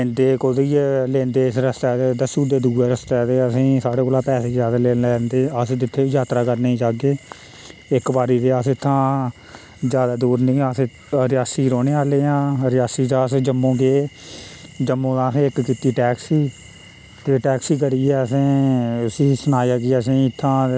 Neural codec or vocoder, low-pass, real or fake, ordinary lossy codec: none; none; real; none